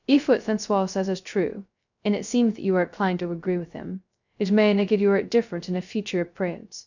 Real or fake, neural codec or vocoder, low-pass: fake; codec, 16 kHz, 0.2 kbps, FocalCodec; 7.2 kHz